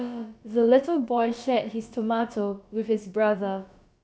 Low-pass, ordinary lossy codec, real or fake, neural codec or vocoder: none; none; fake; codec, 16 kHz, about 1 kbps, DyCAST, with the encoder's durations